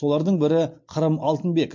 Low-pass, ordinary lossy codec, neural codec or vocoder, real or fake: 7.2 kHz; MP3, 48 kbps; none; real